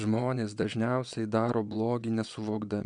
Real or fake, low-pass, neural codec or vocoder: fake; 9.9 kHz; vocoder, 22.05 kHz, 80 mel bands, WaveNeXt